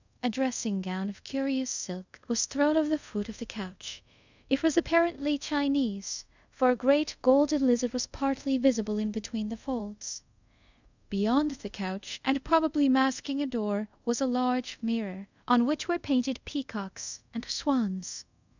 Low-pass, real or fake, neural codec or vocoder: 7.2 kHz; fake; codec, 24 kHz, 0.5 kbps, DualCodec